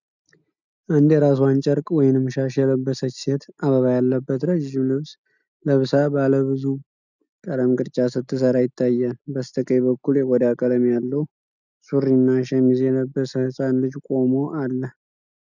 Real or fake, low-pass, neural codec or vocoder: real; 7.2 kHz; none